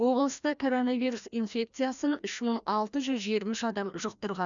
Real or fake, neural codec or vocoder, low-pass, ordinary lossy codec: fake; codec, 16 kHz, 1 kbps, FreqCodec, larger model; 7.2 kHz; none